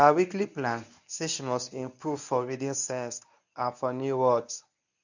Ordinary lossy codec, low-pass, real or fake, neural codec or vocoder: none; 7.2 kHz; fake; codec, 24 kHz, 0.9 kbps, WavTokenizer, medium speech release version 1